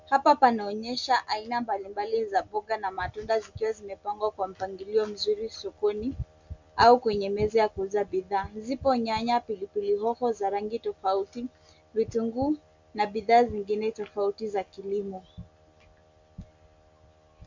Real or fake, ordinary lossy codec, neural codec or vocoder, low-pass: real; MP3, 64 kbps; none; 7.2 kHz